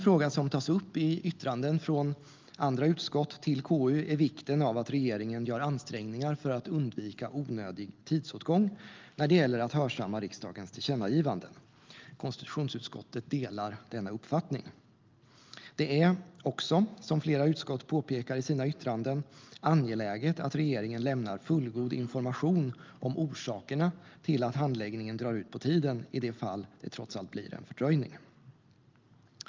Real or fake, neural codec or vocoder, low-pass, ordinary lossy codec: real; none; 7.2 kHz; Opus, 24 kbps